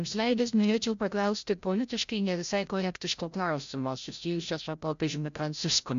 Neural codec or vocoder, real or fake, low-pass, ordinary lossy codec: codec, 16 kHz, 0.5 kbps, FreqCodec, larger model; fake; 7.2 kHz; MP3, 64 kbps